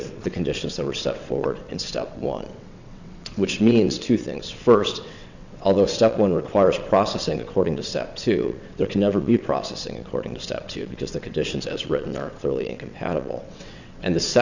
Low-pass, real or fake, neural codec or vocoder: 7.2 kHz; fake; vocoder, 22.05 kHz, 80 mel bands, WaveNeXt